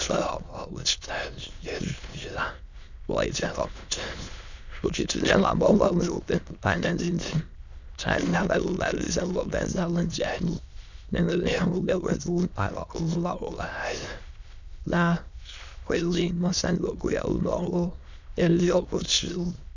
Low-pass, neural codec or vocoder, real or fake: 7.2 kHz; autoencoder, 22.05 kHz, a latent of 192 numbers a frame, VITS, trained on many speakers; fake